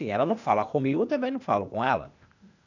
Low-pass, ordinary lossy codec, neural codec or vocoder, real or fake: 7.2 kHz; none; codec, 16 kHz, 0.8 kbps, ZipCodec; fake